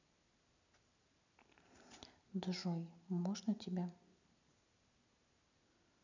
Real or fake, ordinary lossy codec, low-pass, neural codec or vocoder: real; none; 7.2 kHz; none